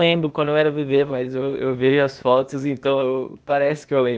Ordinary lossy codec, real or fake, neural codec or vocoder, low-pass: none; fake; codec, 16 kHz, 0.8 kbps, ZipCodec; none